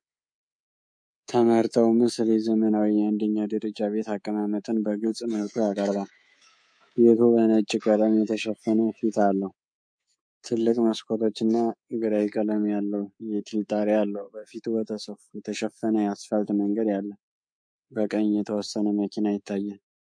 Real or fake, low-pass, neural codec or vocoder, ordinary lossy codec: fake; 9.9 kHz; codec, 24 kHz, 3.1 kbps, DualCodec; MP3, 48 kbps